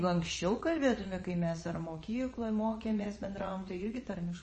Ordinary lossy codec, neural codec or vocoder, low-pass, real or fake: MP3, 32 kbps; vocoder, 22.05 kHz, 80 mel bands, Vocos; 9.9 kHz; fake